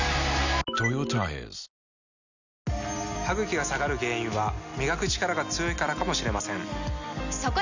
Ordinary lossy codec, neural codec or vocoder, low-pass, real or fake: none; none; 7.2 kHz; real